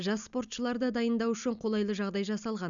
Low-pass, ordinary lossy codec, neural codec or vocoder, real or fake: 7.2 kHz; none; none; real